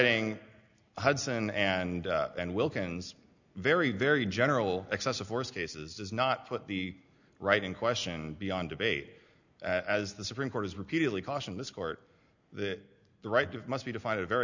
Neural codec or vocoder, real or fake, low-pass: none; real; 7.2 kHz